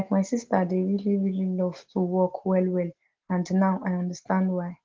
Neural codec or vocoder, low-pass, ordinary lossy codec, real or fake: none; 7.2 kHz; Opus, 16 kbps; real